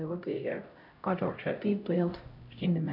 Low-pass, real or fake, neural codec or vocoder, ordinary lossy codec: 5.4 kHz; fake; codec, 16 kHz, 0.5 kbps, X-Codec, HuBERT features, trained on LibriSpeech; none